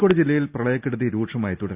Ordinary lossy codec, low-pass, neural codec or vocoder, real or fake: Opus, 64 kbps; 3.6 kHz; none; real